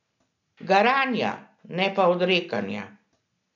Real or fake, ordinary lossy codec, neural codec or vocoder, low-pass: real; none; none; 7.2 kHz